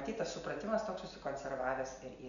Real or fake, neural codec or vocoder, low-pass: real; none; 7.2 kHz